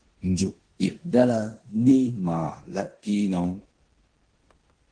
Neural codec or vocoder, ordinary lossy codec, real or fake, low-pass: codec, 16 kHz in and 24 kHz out, 0.9 kbps, LongCat-Audio-Codec, four codebook decoder; Opus, 16 kbps; fake; 9.9 kHz